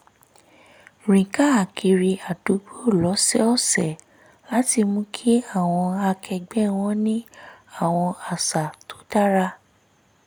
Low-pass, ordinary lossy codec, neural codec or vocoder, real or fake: none; none; none; real